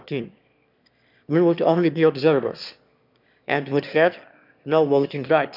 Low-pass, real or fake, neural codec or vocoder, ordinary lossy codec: 5.4 kHz; fake; autoencoder, 22.05 kHz, a latent of 192 numbers a frame, VITS, trained on one speaker; none